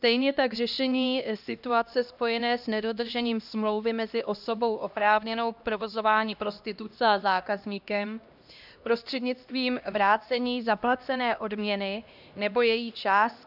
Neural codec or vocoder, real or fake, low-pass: codec, 16 kHz, 1 kbps, X-Codec, HuBERT features, trained on LibriSpeech; fake; 5.4 kHz